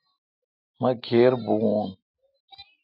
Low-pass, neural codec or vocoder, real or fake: 5.4 kHz; none; real